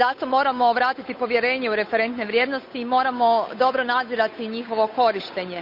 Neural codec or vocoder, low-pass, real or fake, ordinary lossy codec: codec, 16 kHz, 8 kbps, FunCodec, trained on Chinese and English, 25 frames a second; 5.4 kHz; fake; none